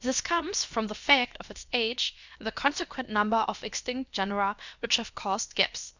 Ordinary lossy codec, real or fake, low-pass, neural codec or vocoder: Opus, 64 kbps; fake; 7.2 kHz; codec, 16 kHz, about 1 kbps, DyCAST, with the encoder's durations